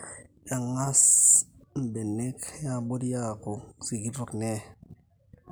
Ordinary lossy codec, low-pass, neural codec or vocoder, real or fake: none; none; none; real